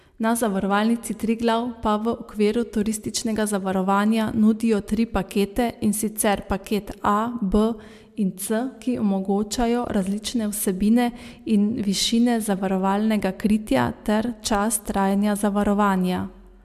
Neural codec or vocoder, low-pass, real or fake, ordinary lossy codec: none; 14.4 kHz; real; MP3, 96 kbps